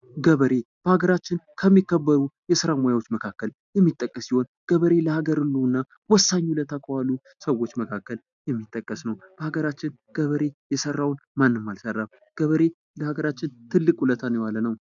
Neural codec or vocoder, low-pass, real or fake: none; 7.2 kHz; real